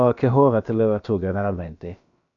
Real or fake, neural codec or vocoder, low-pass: fake; codec, 16 kHz, about 1 kbps, DyCAST, with the encoder's durations; 7.2 kHz